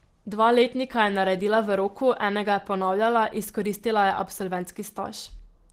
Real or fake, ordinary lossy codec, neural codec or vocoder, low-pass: real; Opus, 16 kbps; none; 14.4 kHz